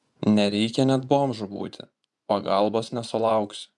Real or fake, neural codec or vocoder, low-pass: fake; vocoder, 24 kHz, 100 mel bands, Vocos; 10.8 kHz